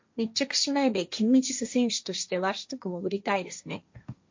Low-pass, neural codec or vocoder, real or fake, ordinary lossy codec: 7.2 kHz; codec, 16 kHz, 1.1 kbps, Voila-Tokenizer; fake; MP3, 48 kbps